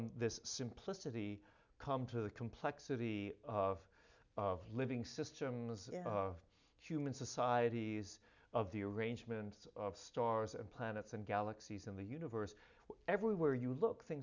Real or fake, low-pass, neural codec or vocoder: real; 7.2 kHz; none